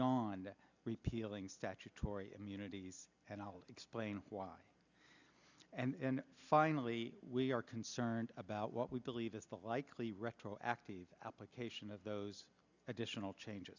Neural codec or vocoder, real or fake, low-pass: none; real; 7.2 kHz